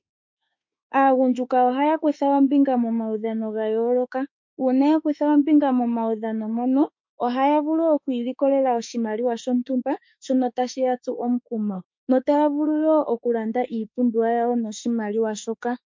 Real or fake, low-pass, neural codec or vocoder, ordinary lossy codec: fake; 7.2 kHz; autoencoder, 48 kHz, 32 numbers a frame, DAC-VAE, trained on Japanese speech; MP3, 48 kbps